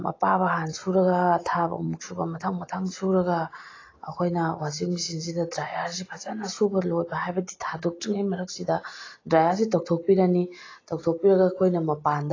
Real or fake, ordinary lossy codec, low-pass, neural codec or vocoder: real; AAC, 32 kbps; 7.2 kHz; none